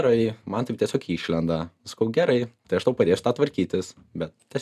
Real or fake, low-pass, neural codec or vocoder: real; 14.4 kHz; none